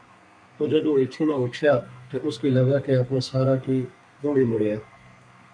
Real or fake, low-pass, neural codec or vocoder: fake; 9.9 kHz; codec, 32 kHz, 1.9 kbps, SNAC